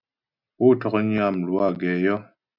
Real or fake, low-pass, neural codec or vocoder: real; 5.4 kHz; none